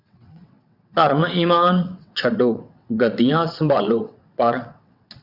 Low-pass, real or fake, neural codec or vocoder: 5.4 kHz; fake; vocoder, 22.05 kHz, 80 mel bands, WaveNeXt